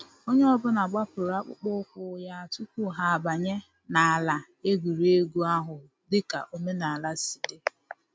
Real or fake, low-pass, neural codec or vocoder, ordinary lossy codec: real; none; none; none